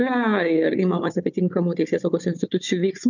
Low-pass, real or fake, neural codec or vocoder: 7.2 kHz; fake; codec, 16 kHz, 4 kbps, FunCodec, trained on Chinese and English, 50 frames a second